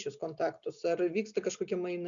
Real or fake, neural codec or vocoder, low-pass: real; none; 7.2 kHz